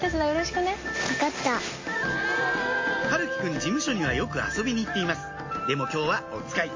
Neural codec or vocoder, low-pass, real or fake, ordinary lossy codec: none; 7.2 kHz; real; MP3, 32 kbps